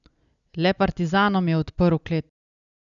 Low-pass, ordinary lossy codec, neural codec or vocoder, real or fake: 7.2 kHz; none; none; real